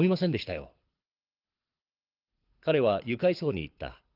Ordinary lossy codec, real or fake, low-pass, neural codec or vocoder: Opus, 32 kbps; fake; 5.4 kHz; codec, 24 kHz, 6 kbps, HILCodec